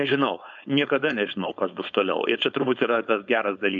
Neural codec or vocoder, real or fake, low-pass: codec, 16 kHz, 4.8 kbps, FACodec; fake; 7.2 kHz